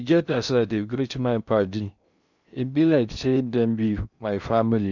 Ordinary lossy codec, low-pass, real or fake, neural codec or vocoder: none; 7.2 kHz; fake; codec, 16 kHz in and 24 kHz out, 0.6 kbps, FocalCodec, streaming, 4096 codes